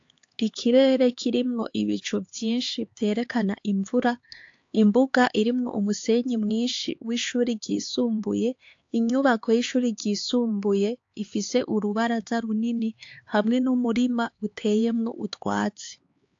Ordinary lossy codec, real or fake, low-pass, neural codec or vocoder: AAC, 48 kbps; fake; 7.2 kHz; codec, 16 kHz, 4 kbps, X-Codec, HuBERT features, trained on LibriSpeech